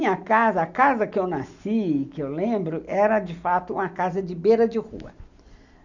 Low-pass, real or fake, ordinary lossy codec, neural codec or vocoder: 7.2 kHz; real; none; none